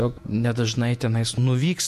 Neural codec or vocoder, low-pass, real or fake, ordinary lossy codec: vocoder, 48 kHz, 128 mel bands, Vocos; 14.4 kHz; fake; MP3, 64 kbps